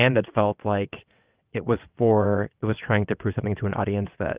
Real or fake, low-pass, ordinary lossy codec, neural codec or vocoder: fake; 3.6 kHz; Opus, 24 kbps; vocoder, 22.05 kHz, 80 mel bands, WaveNeXt